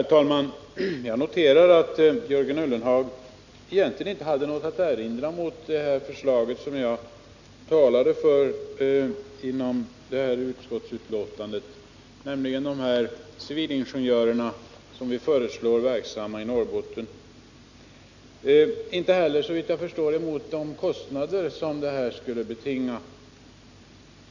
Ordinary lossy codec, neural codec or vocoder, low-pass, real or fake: none; none; 7.2 kHz; real